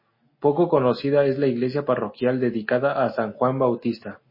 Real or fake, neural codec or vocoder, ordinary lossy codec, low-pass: real; none; MP3, 24 kbps; 5.4 kHz